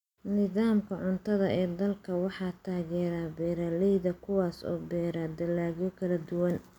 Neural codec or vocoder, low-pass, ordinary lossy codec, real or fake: none; 19.8 kHz; none; real